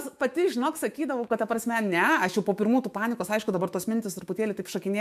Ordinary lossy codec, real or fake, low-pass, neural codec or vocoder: MP3, 96 kbps; fake; 14.4 kHz; autoencoder, 48 kHz, 128 numbers a frame, DAC-VAE, trained on Japanese speech